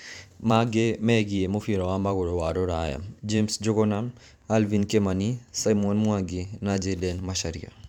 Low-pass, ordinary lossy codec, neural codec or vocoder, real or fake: 19.8 kHz; none; vocoder, 44.1 kHz, 128 mel bands every 512 samples, BigVGAN v2; fake